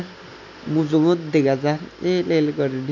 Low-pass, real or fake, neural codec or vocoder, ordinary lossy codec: 7.2 kHz; real; none; none